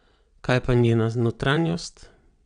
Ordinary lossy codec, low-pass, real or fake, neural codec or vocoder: none; 9.9 kHz; fake; vocoder, 22.05 kHz, 80 mel bands, WaveNeXt